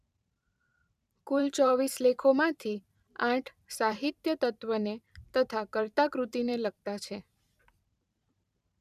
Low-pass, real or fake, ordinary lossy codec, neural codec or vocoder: 14.4 kHz; fake; none; vocoder, 44.1 kHz, 128 mel bands every 512 samples, BigVGAN v2